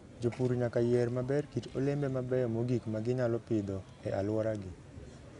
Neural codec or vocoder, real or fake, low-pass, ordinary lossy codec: none; real; 10.8 kHz; none